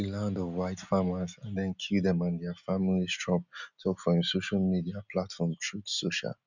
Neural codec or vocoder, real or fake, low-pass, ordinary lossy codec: none; real; 7.2 kHz; none